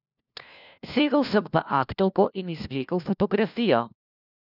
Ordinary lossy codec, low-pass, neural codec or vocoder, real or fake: none; 5.4 kHz; codec, 16 kHz, 1 kbps, FunCodec, trained on LibriTTS, 50 frames a second; fake